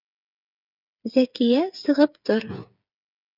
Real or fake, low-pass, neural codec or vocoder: fake; 5.4 kHz; codec, 16 kHz, 8 kbps, FreqCodec, smaller model